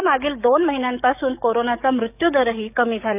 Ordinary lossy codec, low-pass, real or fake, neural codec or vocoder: none; 3.6 kHz; fake; codec, 44.1 kHz, 7.8 kbps, DAC